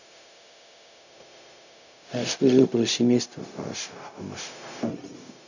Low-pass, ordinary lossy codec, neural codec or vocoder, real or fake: 7.2 kHz; none; codec, 16 kHz, 0.4 kbps, LongCat-Audio-Codec; fake